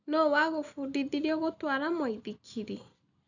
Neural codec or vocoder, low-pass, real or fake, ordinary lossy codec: none; 7.2 kHz; real; none